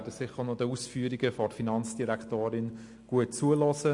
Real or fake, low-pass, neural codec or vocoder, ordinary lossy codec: real; 10.8 kHz; none; MP3, 48 kbps